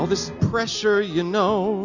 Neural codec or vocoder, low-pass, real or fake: none; 7.2 kHz; real